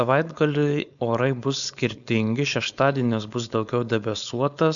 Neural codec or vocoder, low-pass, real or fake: codec, 16 kHz, 4.8 kbps, FACodec; 7.2 kHz; fake